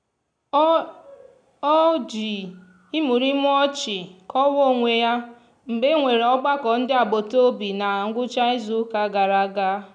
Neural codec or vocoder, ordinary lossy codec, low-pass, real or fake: none; none; 9.9 kHz; real